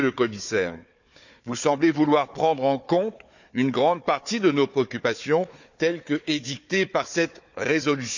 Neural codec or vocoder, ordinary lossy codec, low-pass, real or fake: codec, 16 kHz, 4 kbps, FunCodec, trained on Chinese and English, 50 frames a second; none; 7.2 kHz; fake